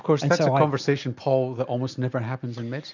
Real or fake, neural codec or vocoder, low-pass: real; none; 7.2 kHz